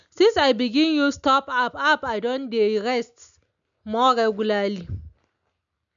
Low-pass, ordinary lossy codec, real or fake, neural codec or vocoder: 7.2 kHz; none; real; none